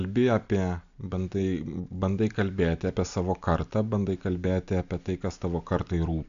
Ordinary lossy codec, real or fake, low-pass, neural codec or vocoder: AAC, 96 kbps; real; 7.2 kHz; none